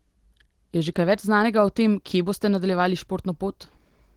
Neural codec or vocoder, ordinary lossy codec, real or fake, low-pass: none; Opus, 16 kbps; real; 19.8 kHz